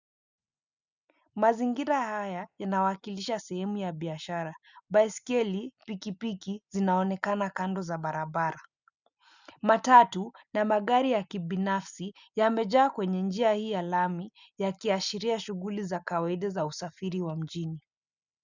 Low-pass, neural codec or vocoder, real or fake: 7.2 kHz; none; real